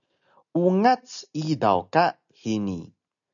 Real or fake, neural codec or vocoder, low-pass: real; none; 7.2 kHz